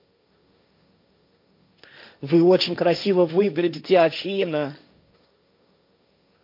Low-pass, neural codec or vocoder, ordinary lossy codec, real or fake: 5.4 kHz; codec, 16 kHz, 1.1 kbps, Voila-Tokenizer; MP3, 48 kbps; fake